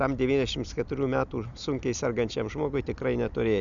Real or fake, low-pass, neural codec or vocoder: real; 7.2 kHz; none